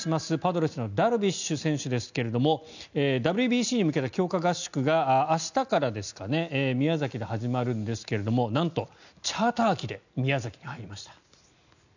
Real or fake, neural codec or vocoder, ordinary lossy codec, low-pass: real; none; none; 7.2 kHz